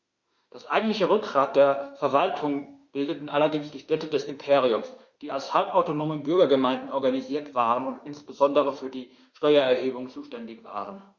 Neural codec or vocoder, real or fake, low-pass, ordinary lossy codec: autoencoder, 48 kHz, 32 numbers a frame, DAC-VAE, trained on Japanese speech; fake; 7.2 kHz; Opus, 64 kbps